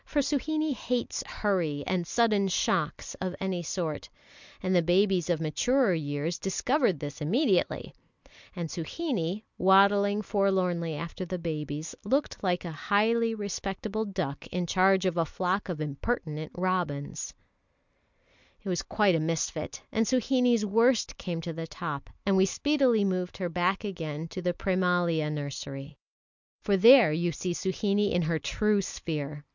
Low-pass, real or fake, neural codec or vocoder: 7.2 kHz; real; none